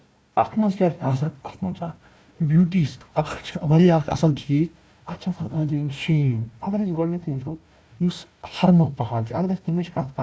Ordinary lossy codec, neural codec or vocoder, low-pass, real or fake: none; codec, 16 kHz, 1 kbps, FunCodec, trained on Chinese and English, 50 frames a second; none; fake